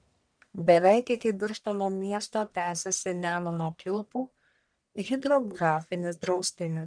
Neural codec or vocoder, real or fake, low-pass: codec, 44.1 kHz, 1.7 kbps, Pupu-Codec; fake; 9.9 kHz